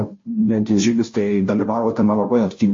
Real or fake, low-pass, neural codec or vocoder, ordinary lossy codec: fake; 7.2 kHz; codec, 16 kHz, 0.5 kbps, FunCodec, trained on Chinese and English, 25 frames a second; MP3, 32 kbps